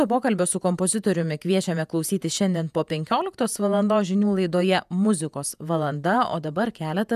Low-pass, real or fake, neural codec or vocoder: 14.4 kHz; fake; vocoder, 48 kHz, 128 mel bands, Vocos